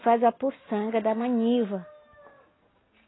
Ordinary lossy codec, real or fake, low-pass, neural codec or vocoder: AAC, 16 kbps; real; 7.2 kHz; none